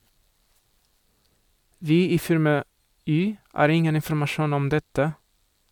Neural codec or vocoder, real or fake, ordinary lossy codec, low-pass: none; real; MP3, 96 kbps; 19.8 kHz